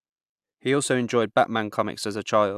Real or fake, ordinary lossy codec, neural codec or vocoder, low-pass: real; AAC, 96 kbps; none; 14.4 kHz